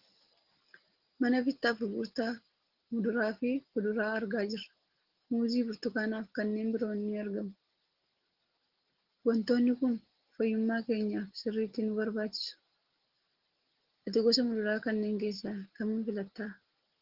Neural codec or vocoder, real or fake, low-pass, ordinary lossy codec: none; real; 5.4 kHz; Opus, 16 kbps